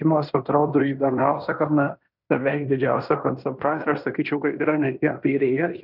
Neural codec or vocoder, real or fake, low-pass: codec, 16 kHz in and 24 kHz out, 0.9 kbps, LongCat-Audio-Codec, fine tuned four codebook decoder; fake; 5.4 kHz